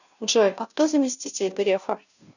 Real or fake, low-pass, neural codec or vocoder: fake; 7.2 kHz; codec, 16 kHz, 0.5 kbps, FunCodec, trained on Chinese and English, 25 frames a second